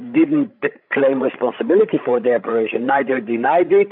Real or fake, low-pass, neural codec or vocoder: fake; 5.4 kHz; codec, 16 kHz, 16 kbps, FreqCodec, larger model